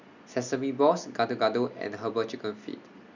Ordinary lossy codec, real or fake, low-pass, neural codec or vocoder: none; real; 7.2 kHz; none